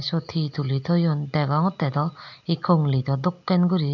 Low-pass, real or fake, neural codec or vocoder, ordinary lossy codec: 7.2 kHz; real; none; none